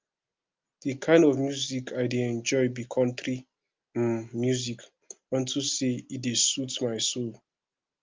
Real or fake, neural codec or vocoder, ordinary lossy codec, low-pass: real; none; Opus, 24 kbps; 7.2 kHz